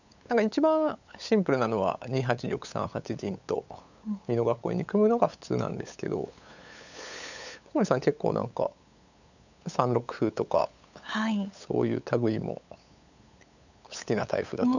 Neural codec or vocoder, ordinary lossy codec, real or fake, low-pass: codec, 16 kHz, 8 kbps, FunCodec, trained on LibriTTS, 25 frames a second; none; fake; 7.2 kHz